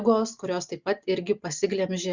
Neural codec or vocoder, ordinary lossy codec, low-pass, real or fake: none; Opus, 64 kbps; 7.2 kHz; real